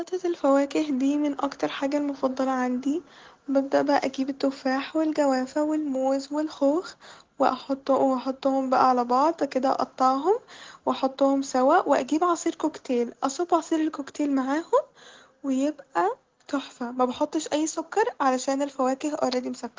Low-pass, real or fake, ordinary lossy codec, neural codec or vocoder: 7.2 kHz; real; Opus, 16 kbps; none